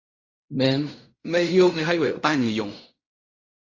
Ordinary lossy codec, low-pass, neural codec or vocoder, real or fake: Opus, 64 kbps; 7.2 kHz; codec, 16 kHz in and 24 kHz out, 0.4 kbps, LongCat-Audio-Codec, fine tuned four codebook decoder; fake